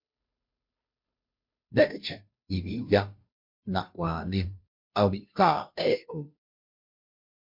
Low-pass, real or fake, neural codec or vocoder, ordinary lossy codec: 5.4 kHz; fake; codec, 16 kHz, 0.5 kbps, FunCodec, trained on Chinese and English, 25 frames a second; MP3, 48 kbps